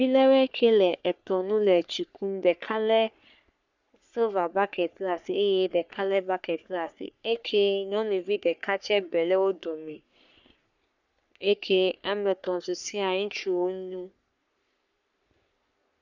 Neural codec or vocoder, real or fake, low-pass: codec, 44.1 kHz, 3.4 kbps, Pupu-Codec; fake; 7.2 kHz